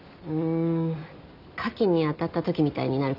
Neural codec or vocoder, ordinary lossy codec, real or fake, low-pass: none; none; real; 5.4 kHz